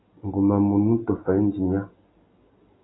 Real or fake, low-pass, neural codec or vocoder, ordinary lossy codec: real; 7.2 kHz; none; AAC, 16 kbps